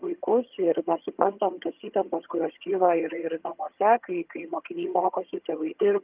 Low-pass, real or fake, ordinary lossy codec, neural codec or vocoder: 3.6 kHz; fake; Opus, 24 kbps; vocoder, 22.05 kHz, 80 mel bands, HiFi-GAN